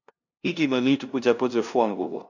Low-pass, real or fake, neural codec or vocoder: 7.2 kHz; fake; codec, 16 kHz, 0.5 kbps, FunCodec, trained on LibriTTS, 25 frames a second